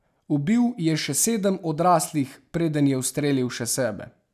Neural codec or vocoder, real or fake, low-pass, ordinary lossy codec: none; real; 14.4 kHz; none